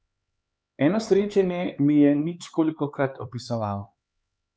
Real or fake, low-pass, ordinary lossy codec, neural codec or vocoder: fake; none; none; codec, 16 kHz, 4 kbps, X-Codec, HuBERT features, trained on LibriSpeech